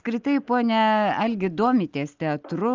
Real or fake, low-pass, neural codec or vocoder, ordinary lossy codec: real; 7.2 kHz; none; Opus, 24 kbps